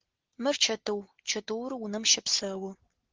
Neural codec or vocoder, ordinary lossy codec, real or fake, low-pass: none; Opus, 16 kbps; real; 7.2 kHz